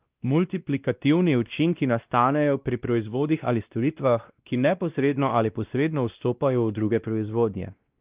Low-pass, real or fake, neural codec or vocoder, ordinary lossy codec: 3.6 kHz; fake; codec, 16 kHz, 1 kbps, X-Codec, WavLM features, trained on Multilingual LibriSpeech; Opus, 24 kbps